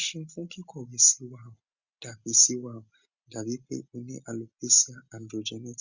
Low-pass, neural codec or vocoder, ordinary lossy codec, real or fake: 7.2 kHz; none; Opus, 64 kbps; real